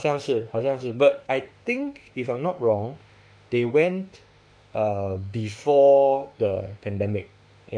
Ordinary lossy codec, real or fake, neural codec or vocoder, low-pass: none; fake; autoencoder, 48 kHz, 32 numbers a frame, DAC-VAE, trained on Japanese speech; 9.9 kHz